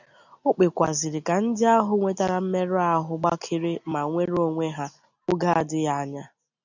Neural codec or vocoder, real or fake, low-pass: none; real; 7.2 kHz